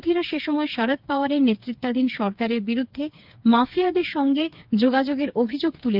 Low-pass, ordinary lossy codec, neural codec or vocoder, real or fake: 5.4 kHz; Opus, 32 kbps; codec, 16 kHz, 4 kbps, FreqCodec, smaller model; fake